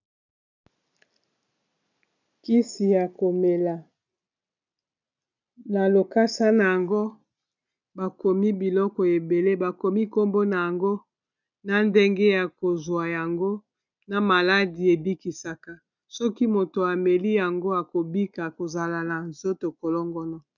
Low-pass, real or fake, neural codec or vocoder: 7.2 kHz; real; none